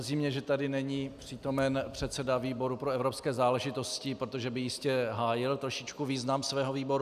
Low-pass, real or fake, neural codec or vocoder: 14.4 kHz; real; none